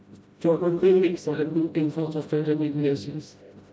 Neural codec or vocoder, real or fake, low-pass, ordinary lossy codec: codec, 16 kHz, 0.5 kbps, FreqCodec, smaller model; fake; none; none